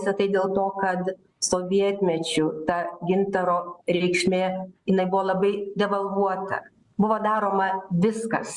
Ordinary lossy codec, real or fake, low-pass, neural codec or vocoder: Opus, 64 kbps; real; 10.8 kHz; none